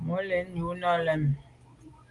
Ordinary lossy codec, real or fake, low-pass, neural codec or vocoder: Opus, 24 kbps; real; 10.8 kHz; none